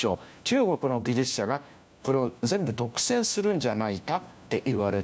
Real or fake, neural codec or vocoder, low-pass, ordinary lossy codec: fake; codec, 16 kHz, 1 kbps, FunCodec, trained on LibriTTS, 50 frames a second; none; none